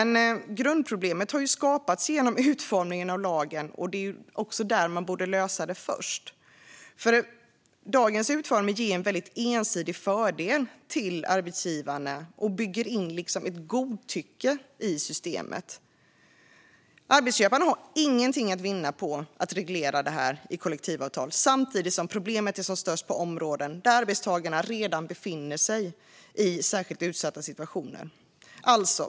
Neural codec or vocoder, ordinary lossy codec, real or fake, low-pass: none; none; real; none